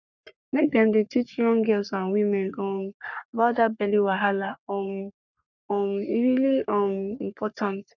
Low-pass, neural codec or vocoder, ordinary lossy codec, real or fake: 7.2 kHz; codec, 44.1 kHz, 3.4 kbps, Pupu-Codec; none; fake